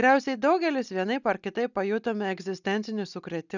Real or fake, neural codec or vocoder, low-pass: real; none; 7.2 kHz